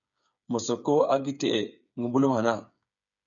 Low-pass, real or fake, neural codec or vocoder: 7.2 kHz; fake; codec, 16 kHz, 8 kbps, FreqCodec, smaller model